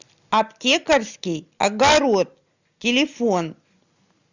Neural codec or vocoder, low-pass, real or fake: none; 7.2 kHz; real